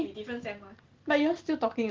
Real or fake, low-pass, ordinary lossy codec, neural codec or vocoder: real; 7.2 kHz; Opus, 16 kbps; none